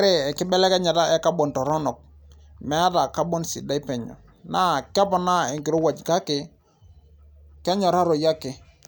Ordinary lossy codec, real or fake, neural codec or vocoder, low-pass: none; real; none; none